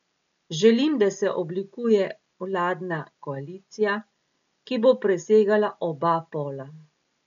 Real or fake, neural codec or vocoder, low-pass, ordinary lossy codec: real; none; 7.2 kHz; none